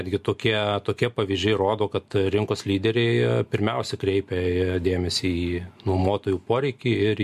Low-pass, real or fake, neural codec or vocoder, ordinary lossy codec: 14.4 kHz; real; none; MP3, 64 kbps